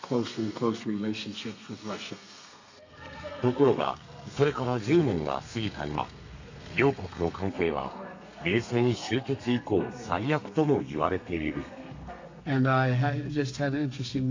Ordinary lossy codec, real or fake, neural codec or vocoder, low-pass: AAC, 48 kbps; fake; codec, 32 kHz, 1.9 kbps, SNAC; 7.2 kHz